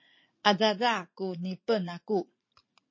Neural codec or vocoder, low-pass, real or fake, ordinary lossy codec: vocoder, 44.1 kHz, 80 mel bands, Vocos; 7.2 kHz; fake; MP3, 32 kbps